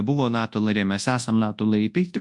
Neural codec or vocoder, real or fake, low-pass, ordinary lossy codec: codec, 24 kHz, 0.9 kbps, WavTokenizer, large speech release; fake; 10.8 kHz; MP3, 64 kbps